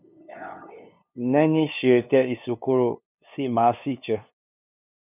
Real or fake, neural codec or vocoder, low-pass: fake; codec, 16 kHz, 2 kbps, FunCodec, trained on LibriTTS, 25 frames a second; 3.6 kHz